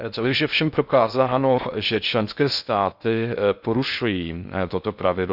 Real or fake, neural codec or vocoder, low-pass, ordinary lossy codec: fake; codec, 16 kHz in and 24 kHz out, 0.6 kbps, FocalCodec, streaming, 2048 codes; 5.4 kHz; none